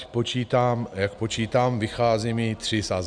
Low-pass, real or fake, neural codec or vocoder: 9.9 kHz; real; none